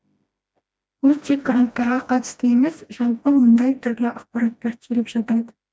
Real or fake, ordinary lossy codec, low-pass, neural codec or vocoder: fake; none; none; codec, 16 kHz, 1 kbps, FreqCodec, smaller model